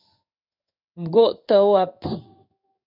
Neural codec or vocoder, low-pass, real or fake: codec, 16 kHz in and 24 kHz out, 1 kbps, XY-Tokenizer; 5.4 kHz; fake